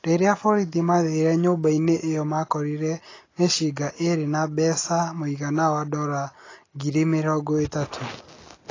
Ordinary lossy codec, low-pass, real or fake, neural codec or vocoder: AAC, 32 kbps; 7.2 kHz; real; none